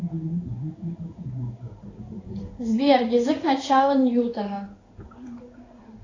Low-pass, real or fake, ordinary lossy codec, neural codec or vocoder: 7.2 kHz; fake; AAC, 32 kbps; codec, 16 kHz in and 24 kHz out, 1 kbps, XY-Tokenizer